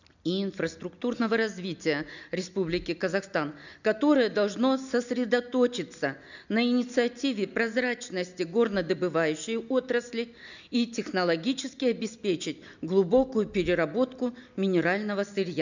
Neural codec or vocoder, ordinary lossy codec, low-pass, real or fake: none; none; 7.2 kHz; real